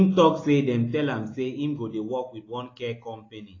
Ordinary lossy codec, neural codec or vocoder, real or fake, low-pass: AAC, 32 kbps; none; real; 7.2 kHz